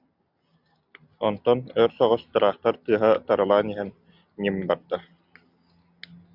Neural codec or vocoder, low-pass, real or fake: none; 5.4 kHz; real